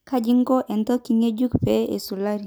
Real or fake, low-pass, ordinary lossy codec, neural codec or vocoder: real; none; none; none